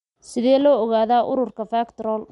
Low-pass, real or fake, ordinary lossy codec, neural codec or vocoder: 10.8 kHz; real; MP3, 64 kbps; none